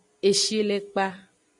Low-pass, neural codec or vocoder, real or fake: 10.8 kHz; none; real